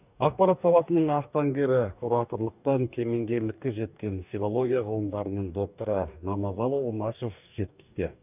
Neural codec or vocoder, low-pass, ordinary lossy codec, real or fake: codec, 44.1 kHz, 2.6 kbps, DAC; 3.6 kHz; none; fake